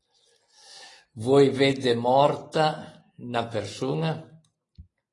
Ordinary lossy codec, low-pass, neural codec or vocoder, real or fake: AAC, 32 kbps; 10.8 kHz; none; real